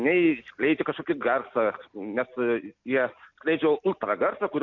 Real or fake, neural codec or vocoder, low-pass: real; none; 7.2 kHz